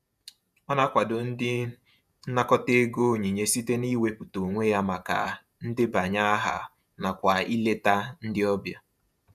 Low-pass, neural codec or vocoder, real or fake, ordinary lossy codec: 14.4 kHz; vocoder, 48 kHz, 128 mel bands, Vocos; fake; none